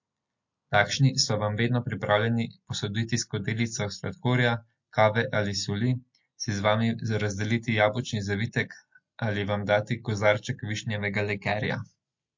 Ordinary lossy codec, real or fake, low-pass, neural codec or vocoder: MP3, 48 kbps; real; 7.2 kHz; none